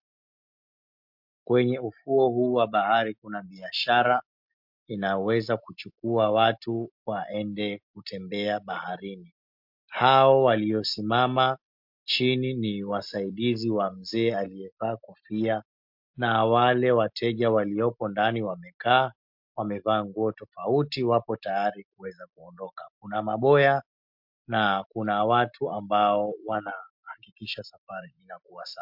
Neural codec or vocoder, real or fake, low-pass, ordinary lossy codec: none; real; 5.4 kHz; MP3, 48 kbps